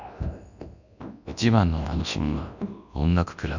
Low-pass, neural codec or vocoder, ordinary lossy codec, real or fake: 7.2 kHz; codec, 24 kHz, 0.9 kbps, WavTokenizer, large speech release; none; fake